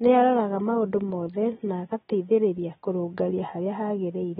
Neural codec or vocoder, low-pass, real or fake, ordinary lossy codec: none; 19.8 kHz; real; AAC, 16 kbps